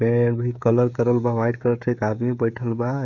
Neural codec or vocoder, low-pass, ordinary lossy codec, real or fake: codec, 16 kHz, 16 kbps, FreqCodec, smaller model; 7.2 kHz; none; fake